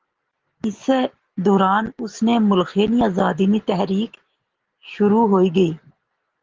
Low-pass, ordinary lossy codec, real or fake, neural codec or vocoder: 7.2 kHz; Opus, 16 kbps; real; none